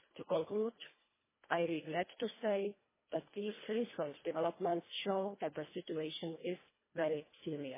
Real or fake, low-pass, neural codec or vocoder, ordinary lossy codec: fake; 3.6 kHz; codec, 24 kHz, 1.5 kbps, HILCodec; MP3, 16 kbps